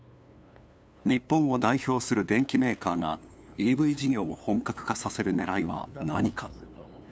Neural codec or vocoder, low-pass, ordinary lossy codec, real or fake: codec, 16 kHz, 2 kbps, FunCodec, trained on LibriTTS, 25 frames a second; none; none; fake